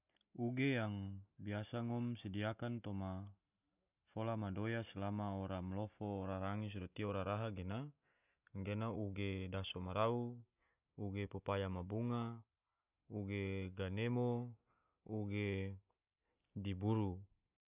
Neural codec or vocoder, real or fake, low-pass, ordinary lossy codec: none; real; 3.6 kHz; none